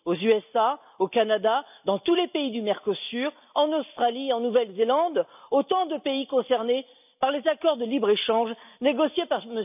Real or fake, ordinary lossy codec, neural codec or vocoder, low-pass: real; none; none; 3.6 kHz